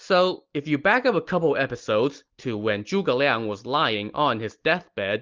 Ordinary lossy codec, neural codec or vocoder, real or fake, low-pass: Opus, 32 kbps; none; real; 7.2 kHz